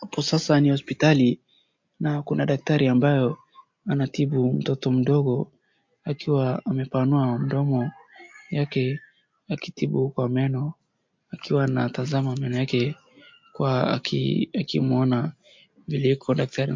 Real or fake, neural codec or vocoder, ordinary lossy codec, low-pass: real; none; MP3, 48 kbps; 7.2 kHz